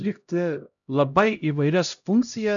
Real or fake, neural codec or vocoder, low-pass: fake; codec, 16 kHz, 0.5 kbps, X-Codec, HuBERT features, trained on LibriSpeech; 7.2 kHz